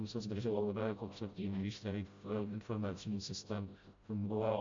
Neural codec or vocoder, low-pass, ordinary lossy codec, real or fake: codec, 16 kHz, 0.5 kbps, FreqCodec, smaller model; 7.2 kHz; MP3, 48 kbps; fake